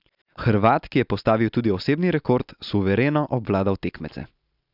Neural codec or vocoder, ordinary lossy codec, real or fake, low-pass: none; none; real; 5.4 kHz